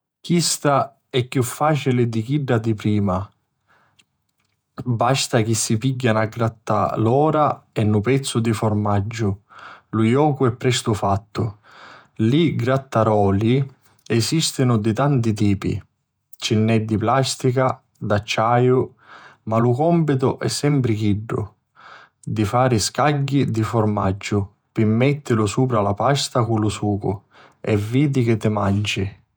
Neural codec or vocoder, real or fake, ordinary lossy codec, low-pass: vocoder, 48 kHz, 128 mel bands, Vocos; fake; none; none